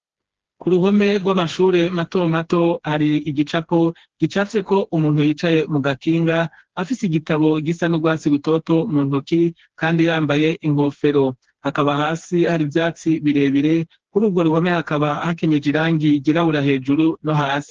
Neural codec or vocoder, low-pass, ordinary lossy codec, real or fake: codec, 16 kHz, 2 kbps, FreqCodec, smaller model; 7.2 kHz; Opus, 16 kbps; fake